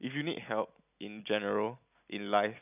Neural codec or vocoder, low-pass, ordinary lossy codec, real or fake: none; 3.6 kHz; none; real